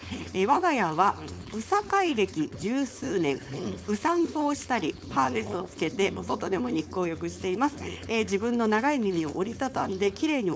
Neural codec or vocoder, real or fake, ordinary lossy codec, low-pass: codec, 16 kHz, 4.8 kbps, FACodec; fake; none; none